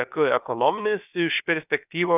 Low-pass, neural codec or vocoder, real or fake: 3.6 kHz; codec, 16 kHz, about 1 kbps, DyCAST, with the encoder's durations; fake